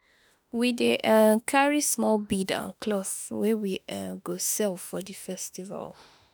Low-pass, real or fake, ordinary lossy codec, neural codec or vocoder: none; fake; none; autoencoder, 48 kHz, 32 numbers a frame, DAC-VAE, trained on Japanese speech